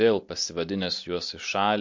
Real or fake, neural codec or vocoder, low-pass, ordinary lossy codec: real; none; 7.2 kHz; MP3, 48 kbps